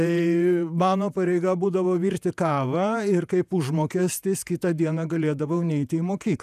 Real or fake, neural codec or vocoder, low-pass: fake; vocoder, 48 kHz, 128 mel bands, Vocos; 14.4 kHz